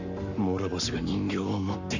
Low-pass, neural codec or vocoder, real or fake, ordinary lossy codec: 7.2 kHz; codec, 44.1 kHz, 7.8 kbps, Pupu-Codec; fake; none